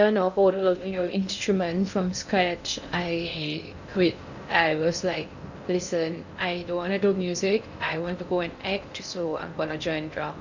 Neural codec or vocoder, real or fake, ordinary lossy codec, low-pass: codec, 16 kHz in and 24 kHz out, 0.6 kbps, FocalCodec, streaming, 2048 codes; fake; none; 7.2 kHz